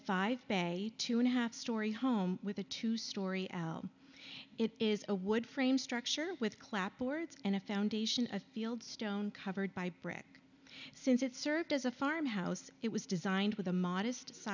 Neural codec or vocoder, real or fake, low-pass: none; real; 7.2 kHz